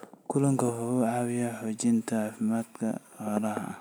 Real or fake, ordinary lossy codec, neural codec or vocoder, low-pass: real; none; none; none